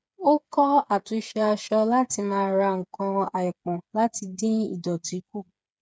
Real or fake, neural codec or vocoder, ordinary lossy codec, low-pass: fake; codec, 16 kHz, 8 kbps, FreqCodec, smaller model; none; none